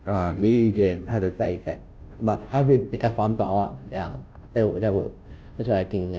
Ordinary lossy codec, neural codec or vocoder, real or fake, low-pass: none; codec, 16 kHz, 0.5 kbps, FunCodec, trained on Chinese and English, 25 frames a second; fake; none